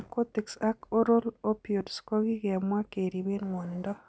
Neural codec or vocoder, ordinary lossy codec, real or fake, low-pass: none; none; real; none